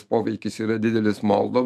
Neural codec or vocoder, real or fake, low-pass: autoencoder, 48 kHz, 128 numbers a frame, DAC-VAE, trained on Japanese speech; fake; 14.4 kHz